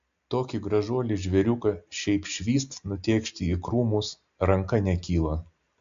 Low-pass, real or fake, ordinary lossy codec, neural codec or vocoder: 7.2 kHz; real; AAC, 64 kbps; none